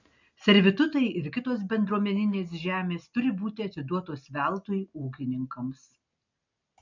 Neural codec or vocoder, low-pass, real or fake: none; 7.2 kHz; real